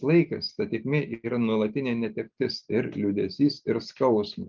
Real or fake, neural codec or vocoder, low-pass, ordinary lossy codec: real; none; 7.2 kHz; Opus, 24 kbps